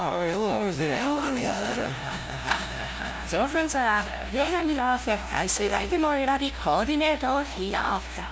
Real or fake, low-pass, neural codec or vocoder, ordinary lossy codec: fake; none; codec, 16 kHz, 0.5 kbps, FunCodec, trained on LibriTTS, 25 frames a second; none